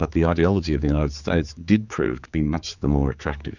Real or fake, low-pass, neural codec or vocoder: fake; 7.2 kHz; codec, 16 kHz, 4 kbps, X-Codec, HuBERT features, trained on general audio